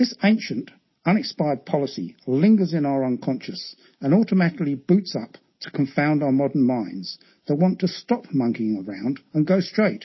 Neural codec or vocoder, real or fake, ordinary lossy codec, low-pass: none; real; MP3, 24 kbps; 7.2 kHz